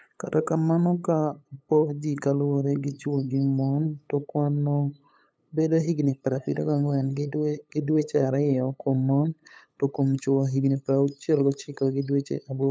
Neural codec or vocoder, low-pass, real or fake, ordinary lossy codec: codec, 16 kHz, 8 kbps, FunCodec, trained on LibriTTS, 25 frames a second; none; fake; none